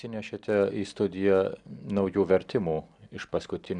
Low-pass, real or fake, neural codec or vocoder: 10.8 kHz; real; none